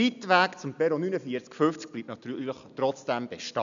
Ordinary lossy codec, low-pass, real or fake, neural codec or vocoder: none; 7.2 kHz; real; none